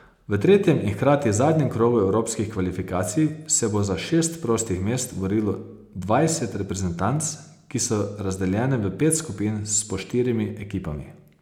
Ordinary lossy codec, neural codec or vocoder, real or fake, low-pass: none; none; real; 19.8 kHz